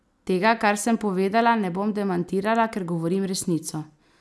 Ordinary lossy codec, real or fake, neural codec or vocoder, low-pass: none; real; none; none